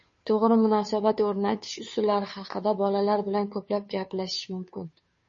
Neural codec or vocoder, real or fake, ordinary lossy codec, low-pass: codec, 16 kHz, 2 kbps, FunCodec, trained on Chinese and English, 25 frames a second; fake; MP3, 32 kbps; 7.2 kHz